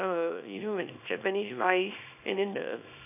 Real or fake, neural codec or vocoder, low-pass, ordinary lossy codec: fake; codec, 24 kHz, 0.9 kbps, WavTokenizer, small release; 3.6 kHz; none